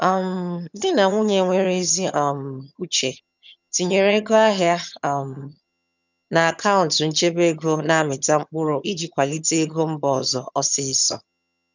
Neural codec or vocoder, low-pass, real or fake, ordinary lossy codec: vocoder, 22.05 kHz, 80 mel bands, HiFi-GAN; 7.2 kHz; fake; none